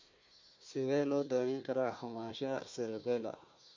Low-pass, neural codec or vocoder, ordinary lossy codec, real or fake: 7.2 kHz; codec, 16 kHz, 1 kbps, FunCodec, trained on Chinese and English, 50 frames a second; MP3, 48 kbps; fake